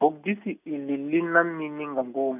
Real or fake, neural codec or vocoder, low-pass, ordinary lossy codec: real; none; 3.6 kHz; none